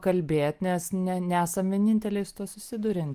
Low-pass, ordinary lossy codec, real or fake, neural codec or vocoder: 14.4 kHz; Opus, 32 kbps; real; none